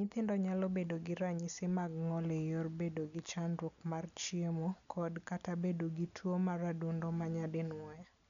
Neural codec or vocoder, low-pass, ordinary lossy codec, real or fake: none; 7.2 kHz; none; real